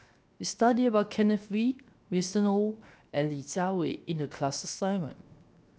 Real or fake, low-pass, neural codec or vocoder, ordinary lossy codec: fake; none; codec, 16 kHz, 0.7 kbps, FocalCodec; none